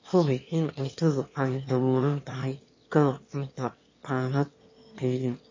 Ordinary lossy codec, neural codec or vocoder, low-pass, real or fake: MP3, 32 kbps; autoencoder, 22.05 kHz, a latent of 192 numbers a frame, VITS, trained on one speaker; 7.2 kHz; fake